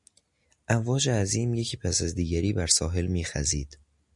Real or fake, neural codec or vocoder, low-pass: real; none; 10.8 kHz